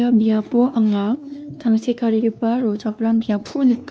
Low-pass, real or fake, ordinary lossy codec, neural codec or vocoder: none; fake; none; codec, 16 kHz, 2 kbps, X-Codec, WavLM features, trained on Multilingual LibriSpeech